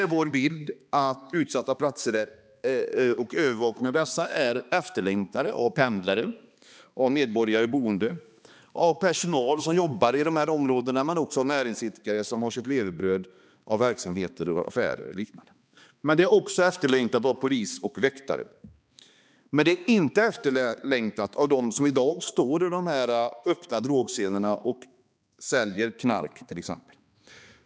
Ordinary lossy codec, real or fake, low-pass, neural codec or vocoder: none; fake; none; codec, 16 kHz, 2 kbps, X-Codec, HuBERT features, trained on balanced general audio